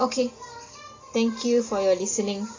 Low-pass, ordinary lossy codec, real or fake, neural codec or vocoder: 7.2 kHz; AAC, 32 kbps; real; none